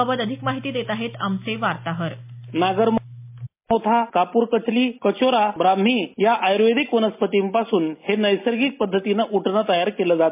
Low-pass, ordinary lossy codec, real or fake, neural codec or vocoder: 3.6 kHz; MP3, 32 kbps; real; none